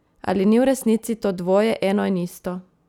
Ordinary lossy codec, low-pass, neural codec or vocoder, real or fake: none; 19.8 kHz; none; real